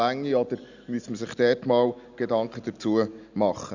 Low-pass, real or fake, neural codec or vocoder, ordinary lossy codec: 7.2 kHz; real; none; none